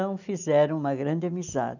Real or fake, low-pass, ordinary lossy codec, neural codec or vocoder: real; 7.2 kHz; none; none